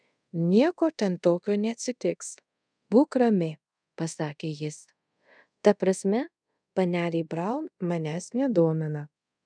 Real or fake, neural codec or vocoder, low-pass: fake; codec, 24 kHz, 0.5 kbps, DualCodec; 9.9 kHz